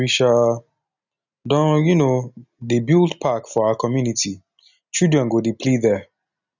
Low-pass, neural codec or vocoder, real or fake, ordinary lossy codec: 7.2 kHz; none; real; none